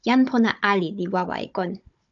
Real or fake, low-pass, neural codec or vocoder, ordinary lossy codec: fake; 7.2 kHz; codec, 16 kHz, 16 kbps, FunCodec, trained on Chinese and English, 50 frames a second; AAC, 64 kbps